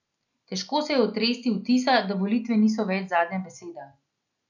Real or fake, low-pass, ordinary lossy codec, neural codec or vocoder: real; 7.2 kHz; none; none